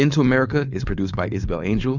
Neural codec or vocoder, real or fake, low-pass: codec, 16 kHz, 4.8 kbps, FACodec; fake; 7.2 kHz